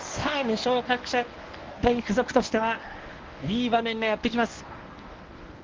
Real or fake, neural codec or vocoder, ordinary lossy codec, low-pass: fake; codec, 24 kHz, 0.9 kbps, WavTokenizer, medium speech release version 1; Opus, 32 kbps; 7.2 kHz